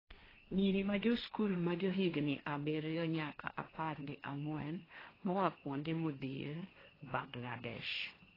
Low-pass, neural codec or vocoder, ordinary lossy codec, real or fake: 5.4 kHz; codec, 16 kHz, 1.1 kbps, Voila-Tokenizer; AAC, 24 kbps; fake